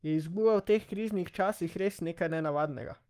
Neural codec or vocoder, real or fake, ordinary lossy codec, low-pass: codec, 44.1 kHz, 7.8 kbps, Pupu-Codec; fake; Opus, 32 kbps; 14.4 kHz